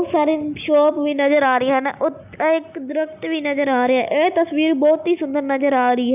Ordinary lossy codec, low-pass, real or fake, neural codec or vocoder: none; 3.6 kHz; real; none